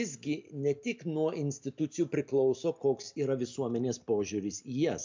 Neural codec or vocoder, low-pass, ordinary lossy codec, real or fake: none; 7.2 kHz; MP3, 64 kbps; real